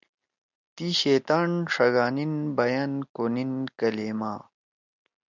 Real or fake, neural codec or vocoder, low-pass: real; none; 7.2 kHz